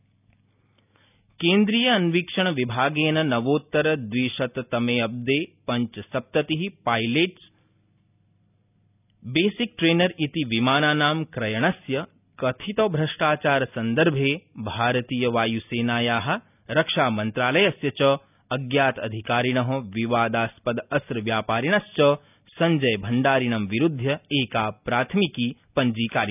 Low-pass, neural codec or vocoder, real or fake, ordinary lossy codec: 3.6 kHz; none; real; none